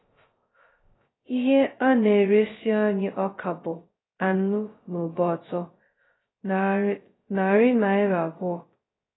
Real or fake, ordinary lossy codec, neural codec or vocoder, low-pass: fake; AAC, 16 kbps; codec, 16 kHz, 0.2 kbps, FocalCodec; 7.2 kHz